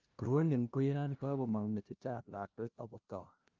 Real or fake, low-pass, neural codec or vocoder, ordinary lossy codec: fake; 7.2 kHz; codec, 16 kHz, 0.5 kbps, FunCodec, trained on LibriTTS, 25 frames a second; Opus, 24 kbps